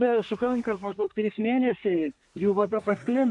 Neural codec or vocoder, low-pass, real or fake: codec, 24 kHz, 1 kbps, SNAC; 10.8 kHz; fake